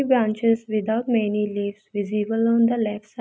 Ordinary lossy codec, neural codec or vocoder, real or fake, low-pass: none; none; real; none